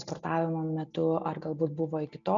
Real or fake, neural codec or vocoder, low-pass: real; none; 7.2 kHz